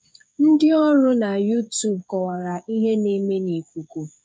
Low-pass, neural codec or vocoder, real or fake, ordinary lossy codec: none; codec, 16 kHz, 8 kbps, FreqCodec, smaller model; fake; none